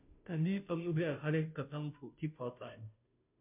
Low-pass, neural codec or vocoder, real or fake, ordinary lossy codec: 3.6 kHz; codec, 16 kHz, 0.5 kbps, FunCodec, trained on Chinese and English, 25 frames a second; fake; MP3, 32 kbps